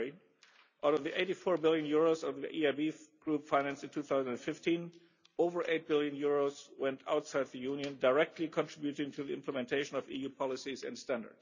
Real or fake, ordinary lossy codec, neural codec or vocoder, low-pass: real; none; none; 7.2 kHz